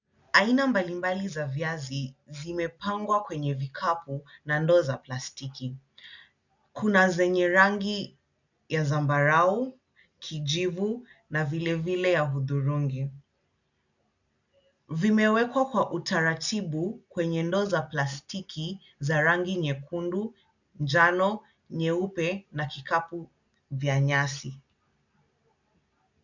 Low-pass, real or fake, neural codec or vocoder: 7.2 kHz; real; none